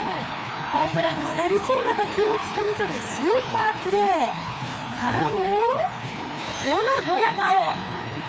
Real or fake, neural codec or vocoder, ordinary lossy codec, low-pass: fake; codec, 16 kHz, 2 kbps, FreqCodec, larger model; none; none